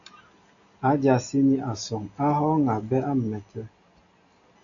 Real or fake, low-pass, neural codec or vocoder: real; 7.2 kHz; none